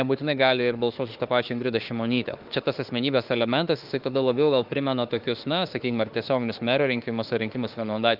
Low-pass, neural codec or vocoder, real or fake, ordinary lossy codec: 5.4 kHz; autoencoder, 48 kHz, 32 numbers a frame, DAC-VAE, trained on Japanese speech; fake; Opus, 32 kbps